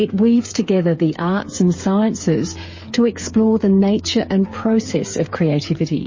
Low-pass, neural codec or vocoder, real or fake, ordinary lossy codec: 7.2 kHz; codec, 16 kHz, 8 kbps, FreqCodec, smaller model; fake; MP3, 32 kbps